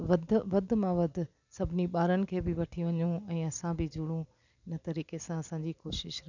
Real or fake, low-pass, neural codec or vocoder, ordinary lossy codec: real; 7.2 kHz; none; none